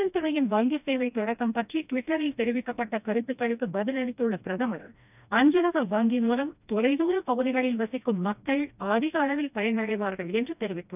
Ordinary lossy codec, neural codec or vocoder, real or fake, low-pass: none; codec, 16 kHz, 1 kbps, FreqCodec, smaller model; fake; 3.6 kHz